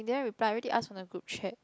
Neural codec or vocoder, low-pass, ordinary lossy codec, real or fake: none; none; none; real